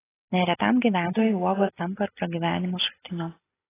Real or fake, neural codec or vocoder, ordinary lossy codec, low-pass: real; none; AAC, 16 kbps; 3.6 kHz